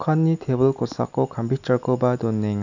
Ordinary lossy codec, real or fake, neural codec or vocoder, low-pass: none; real; none; 7.2 kHz